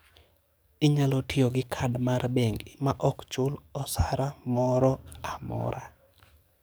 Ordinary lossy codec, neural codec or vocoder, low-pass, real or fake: none; codec, 44.1 kHz, 7.8 kbps, DAC; none; fake